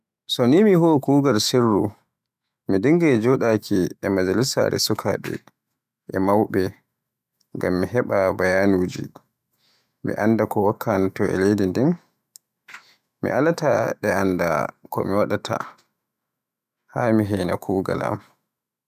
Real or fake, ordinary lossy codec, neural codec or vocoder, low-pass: fake; none; autoencoder, 48 kHz, 128 numbers a frame, DAC-VAE, trained on Japanese speech; 14.4 kHz